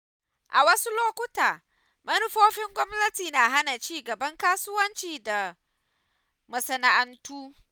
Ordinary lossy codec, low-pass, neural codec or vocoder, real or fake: none; none; none; real